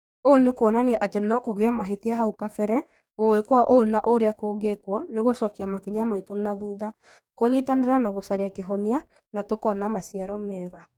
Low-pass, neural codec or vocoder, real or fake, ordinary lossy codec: 19.8 kHz; codec, 44.1 kHz, 2.6 kbps, DAC; fake; none